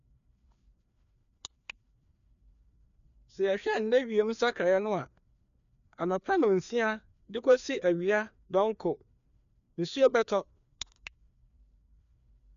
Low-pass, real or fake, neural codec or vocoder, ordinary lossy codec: 7.2 kHz; fake; codec, 16 kHz, 2 kbps, FreqCodec, larger model; none